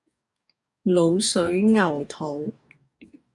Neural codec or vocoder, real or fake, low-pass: codec, 44.1 kHz, 2.6 kbps, DAC; fake; 10.8 kHz